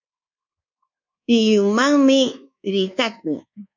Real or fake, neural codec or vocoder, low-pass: fake; codec, 24 kHz, 1.2 kbps, DualCodec; 7.2 kHz